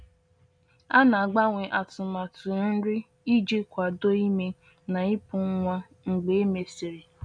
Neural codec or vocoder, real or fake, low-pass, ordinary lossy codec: none; real; 9.9 kHz; none